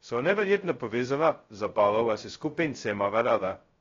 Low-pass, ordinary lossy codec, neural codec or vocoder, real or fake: 7.2 kHz; AAC, 32 kbps; codec, 16 kHz, 0.2 kbps, FocalCodec; fake